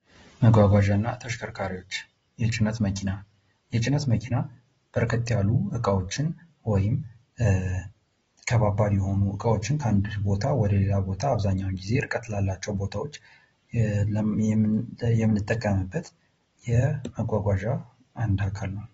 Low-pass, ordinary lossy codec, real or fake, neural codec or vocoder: 19.8 kHz; AAC, 24 kbps; real; none